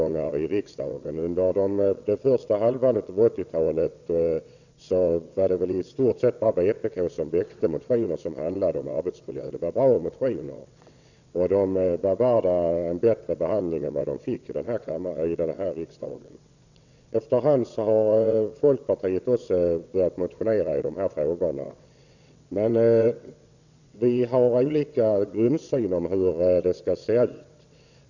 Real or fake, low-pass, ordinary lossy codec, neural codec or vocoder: fake; 7.2 kHz; none; vocoder, 22.05 kHz, 80 mel bands, WaveNeXt